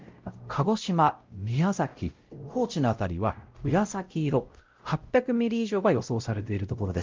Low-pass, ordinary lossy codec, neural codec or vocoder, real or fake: 7.2 kHz; Opus, 32 kbps; codec, 16 kHz, 0.5 kbps, X-Codec, WavLM features, trained on Multilingual LibriSpeech; fake